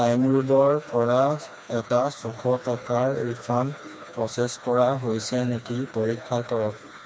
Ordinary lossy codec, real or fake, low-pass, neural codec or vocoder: none; fake; none; codec, 16 kHz, 2 kbps, FreqCodec, smaller model